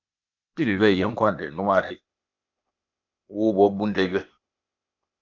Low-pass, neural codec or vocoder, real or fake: 7.2 kHz; codec, 16 kHz, 0.8 kbps, ZipCodec; fake